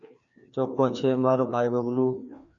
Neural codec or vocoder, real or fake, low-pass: codec, 16 kHz, 2 kbps, FreqCodec, larger model; fake; 7.2 kHz